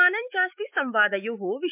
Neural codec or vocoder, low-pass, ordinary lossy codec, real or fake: autoencoder, 48 kHz, 128 numbers a frame, DAC-VAE, trained on Japanese speech; 3.6 kHz; MP3, 32 kbps; fake